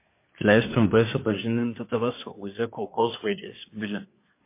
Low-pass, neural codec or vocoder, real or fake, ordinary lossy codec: 3.6 kHz; codec, 24 kHz, 1 kbps, SNAC; fake; MP3, 24 kbps